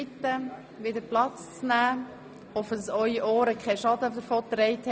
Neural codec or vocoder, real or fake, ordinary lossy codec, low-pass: none; real; none; none